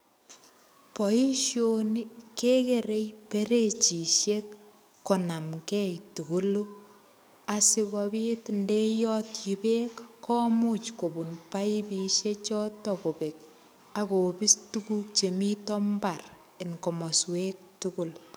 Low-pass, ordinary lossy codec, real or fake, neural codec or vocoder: none; none; fake; codec, 44.1 kHz, 7.8 kbps, DAC